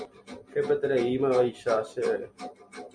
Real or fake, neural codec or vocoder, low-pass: real; none; 9.9 kHz